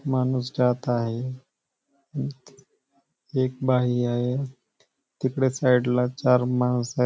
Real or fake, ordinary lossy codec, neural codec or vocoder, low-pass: real; none; none; none